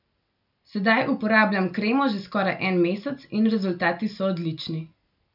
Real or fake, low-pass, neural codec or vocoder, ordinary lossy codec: real; 5.4 kHz; none; none